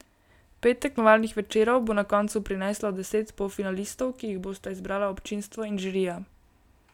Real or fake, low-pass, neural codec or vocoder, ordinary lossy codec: real; 19.8 kHz; none; none